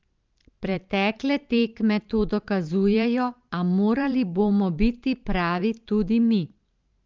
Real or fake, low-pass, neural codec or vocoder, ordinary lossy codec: fake; 7.2 kHz; vocoder, 44.1 kHz, 128 mel bands every 512 samples, BigVGAN v2; Opus, 32 kbps